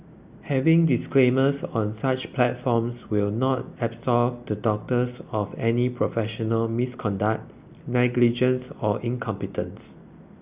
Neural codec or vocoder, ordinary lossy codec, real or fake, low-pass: none; Opus, 64 kbps; real; 3.6 kHz